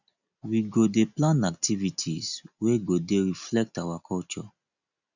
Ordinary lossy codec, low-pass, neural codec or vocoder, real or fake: none; 7.2 kHz; none; real